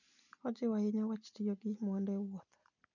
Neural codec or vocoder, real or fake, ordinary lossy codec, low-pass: none; real; none; 7.2 kHz